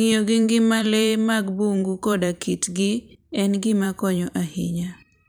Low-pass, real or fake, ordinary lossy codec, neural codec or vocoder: none; fake; none; vocoder, 44.1 kHz, 128 mel bands every 512 samples, BigVGAN v2